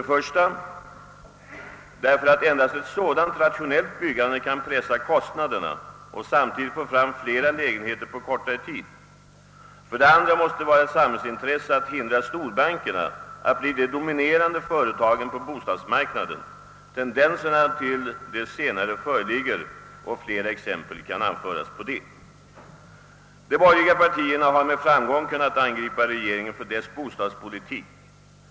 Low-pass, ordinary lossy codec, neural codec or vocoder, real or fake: none; none; none; real